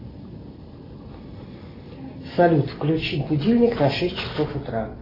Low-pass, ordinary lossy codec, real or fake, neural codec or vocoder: 5.4 kHz; AAC, 24 kbps; real; none